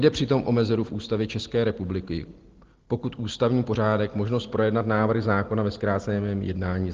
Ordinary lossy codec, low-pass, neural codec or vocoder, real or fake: Opus, 16 kbps; 7.2 kHz; none; real